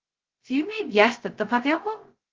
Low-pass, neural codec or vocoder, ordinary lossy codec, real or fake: 7.2 kHz; codec, 16 kHz, 0.2 kbps, FocalCodec; Opus, 16 kbps; fake